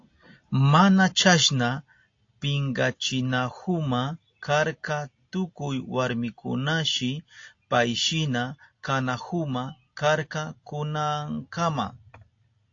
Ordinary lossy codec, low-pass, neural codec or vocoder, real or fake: AAC, 48 kbps; 7.2 kHz; none; real